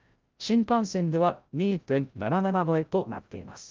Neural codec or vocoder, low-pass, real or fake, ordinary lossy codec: codec, 16 kHz, 0.5 kbps, FreqCodec, larger model; 7.2 kHz; fake; Opus, 24 kbps